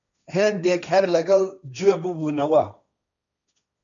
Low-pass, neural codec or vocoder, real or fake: 7.2 kHz; codec, 16 kHz, 1.1 kbps, Voila-Tokenizer; fake